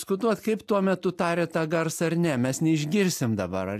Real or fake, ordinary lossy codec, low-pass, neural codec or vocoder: real; AAC, 96 kbps; 14.4 kHz; none